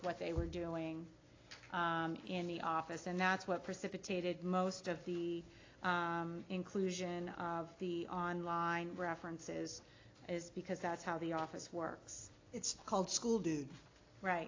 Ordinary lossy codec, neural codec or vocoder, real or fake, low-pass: AAC, 32 kbps; none; real; 7.2 kHz